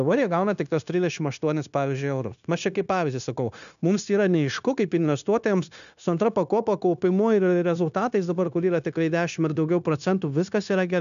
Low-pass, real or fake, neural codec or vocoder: 7.2 kHz; fake; codec, 16 kHz, 0.9 kbps, LongCat-Audio-Codec